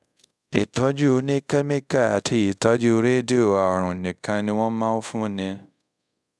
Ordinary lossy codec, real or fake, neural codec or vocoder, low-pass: none; fake; codec, 24 kHz, 0.5 kbps, DualCodec; none